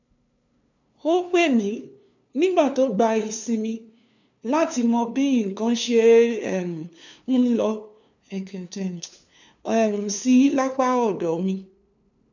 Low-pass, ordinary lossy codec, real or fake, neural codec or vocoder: 7.2 kHz; none; fake; codec, 16 kHz, 2 kbps, FunCodec, trained on LibriTTS, 25 frames a second